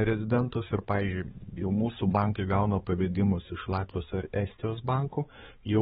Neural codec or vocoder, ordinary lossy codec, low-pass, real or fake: codec, 16 kHz, 4 kbps, X-Codec, HuBERT features, trained on balanced general audio; AAC, 16 kbps; 7.2 kHz; fake